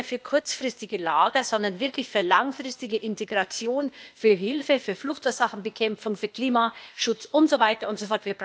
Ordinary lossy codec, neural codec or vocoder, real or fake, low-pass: none; codec, 16 kHz, 0.8 kbps, ZipCodec; fake; none